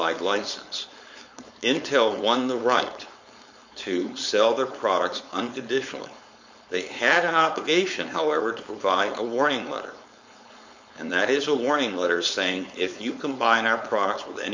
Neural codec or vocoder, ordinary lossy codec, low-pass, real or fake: codec, 16 kHz, 4.8 kbps, FACodec; MP3, 48 kbps; 7.2 kHz; fake